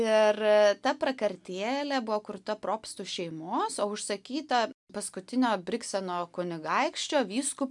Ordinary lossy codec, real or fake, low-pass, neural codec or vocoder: MP3, 96 kbps; real; 10.8 kHz; none